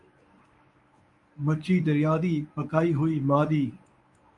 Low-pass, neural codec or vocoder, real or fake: 10.8 kHz; codec, 24 kHz, 0.9 kbps, WavTokenizer, medium speech release version 1; fake